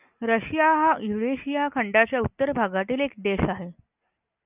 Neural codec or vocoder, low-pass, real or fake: none; 3.6 kHz; real